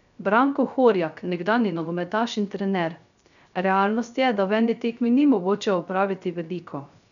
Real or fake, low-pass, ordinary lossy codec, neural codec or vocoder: fake; 7.2 kHz; none; codec, 16 kHz, 0.3 kbps, FocalCodec